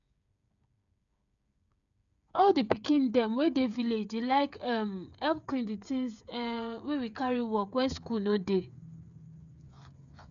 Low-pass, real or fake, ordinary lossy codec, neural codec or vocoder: 7.2 kHz; fake; none; codec, 16 kHz, 8 kbps, FreqCodec, smaller model